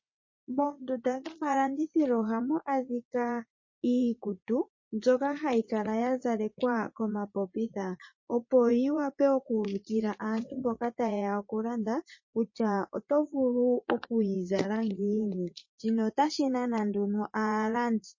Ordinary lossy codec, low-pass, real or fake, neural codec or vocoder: MP3, 32 kbps; 7.2 kHz; fake; vocoder, 44.1 kHz, 80 mel bands, Vocos